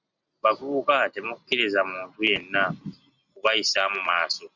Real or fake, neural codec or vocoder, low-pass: real; none; 7.2 kHz